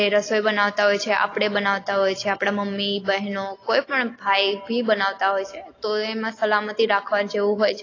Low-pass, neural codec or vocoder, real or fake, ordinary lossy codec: 7.2 kHz; none; real; AAC, 32 kbps